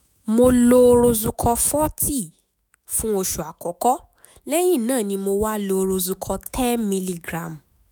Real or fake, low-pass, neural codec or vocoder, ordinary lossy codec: fake; none; autoencoder, 48 kHz, 128 numbers a frame, DAC-VAE, trained on Japanese speech; none